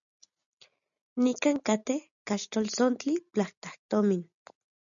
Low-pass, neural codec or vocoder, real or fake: 7.2 kHz; none; real